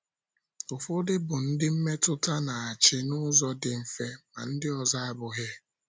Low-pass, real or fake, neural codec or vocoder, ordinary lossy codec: none; real; none; none